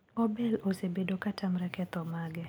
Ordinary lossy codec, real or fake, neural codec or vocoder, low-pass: none; real; none; none